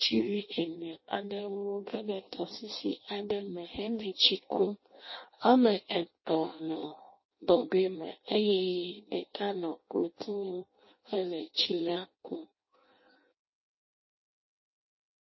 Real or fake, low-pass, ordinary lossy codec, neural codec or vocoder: fake; 7.2 kHz; MP3, 24 kbps; codec, 16 kHz in and 24 kHz out, 0.6 kbps, FireRedTTS-2 codec